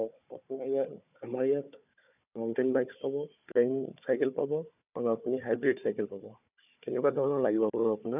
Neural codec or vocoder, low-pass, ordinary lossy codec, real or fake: codec, 16 kHz, 4 kbps, FunCodec, trained on Chinese and English, 50 frames a second; 3.6 kHz; none; fake